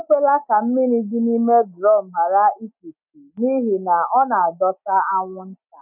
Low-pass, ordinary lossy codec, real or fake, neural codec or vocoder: 3.6 kHz; none; real; none